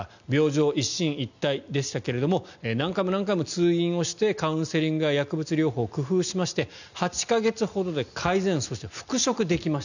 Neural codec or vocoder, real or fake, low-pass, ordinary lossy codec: none; real; 7.2 kHz; none